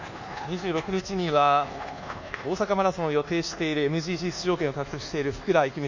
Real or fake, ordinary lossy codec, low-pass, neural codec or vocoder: fake; none; 7.2 kHz; codec, 24 kHz, 1.2 kbps, DualCodec